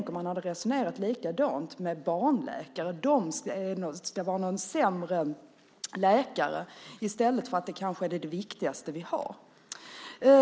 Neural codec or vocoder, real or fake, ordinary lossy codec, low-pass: none; real; none; none